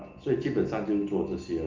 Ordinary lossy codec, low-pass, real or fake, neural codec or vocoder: Opus, 32 kbps; 7.2 kHz; real; none